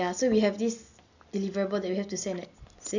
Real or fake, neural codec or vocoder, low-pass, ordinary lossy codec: real; none; 7.2 kHz; none